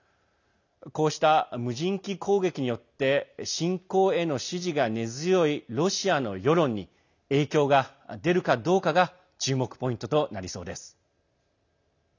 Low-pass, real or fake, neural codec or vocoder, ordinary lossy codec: 7.2 kHz; real; none; none